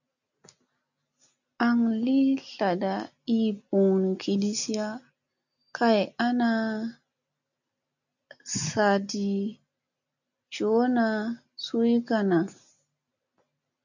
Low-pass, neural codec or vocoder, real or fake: 7.2 kHz; none; real